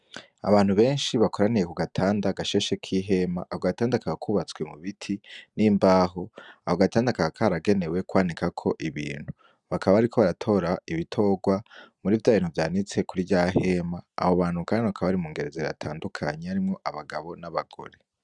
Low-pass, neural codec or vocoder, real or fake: 10.8 kHz; none; real